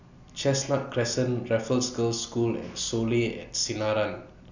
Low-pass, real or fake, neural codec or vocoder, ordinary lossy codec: 7.2 kHz; real; none; none